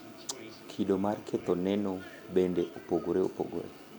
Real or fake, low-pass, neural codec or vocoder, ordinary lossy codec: real; none; none; none